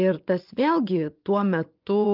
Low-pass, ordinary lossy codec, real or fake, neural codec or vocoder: 5.4 kHz; Opus, 32 kbps; fake; vocoder, 24 kHz, 100 mel bands, Vocos